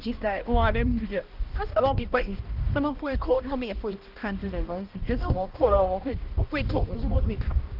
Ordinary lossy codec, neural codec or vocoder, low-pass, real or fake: Opus, 32 kbps; codec, 16 kHz, 1 kbps, X-Codec, HuBERT features, trained on balanced general audio; 5.4 kHz; fake